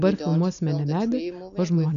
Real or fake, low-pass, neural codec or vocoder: real; 7.2 kHz; none